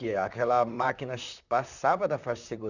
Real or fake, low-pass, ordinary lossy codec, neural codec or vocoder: fake; 7.2 kHz; none; vocoder, 44.1 kHz, 128 mel bands, Pupu-Vocoder